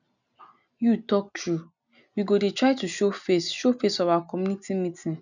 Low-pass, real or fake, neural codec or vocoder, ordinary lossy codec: 7.2 kHz; real; none; none